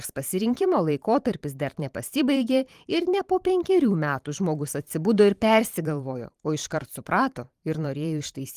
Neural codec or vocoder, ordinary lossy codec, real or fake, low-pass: vocoder, 44.1 kHz, 128 mel bands every 512 samples, BigVGAN v2; Opus, 24 kbps; fake; 14.4 kHz